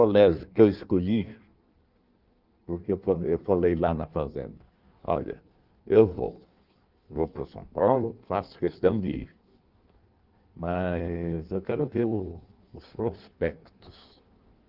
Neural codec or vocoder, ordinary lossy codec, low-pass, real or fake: codec, 16 kHz in and 24 kHz out, 1.1 kbps, FireRedTTS-2 codec; Opus, 32 kbps; 5.4 kHz; fake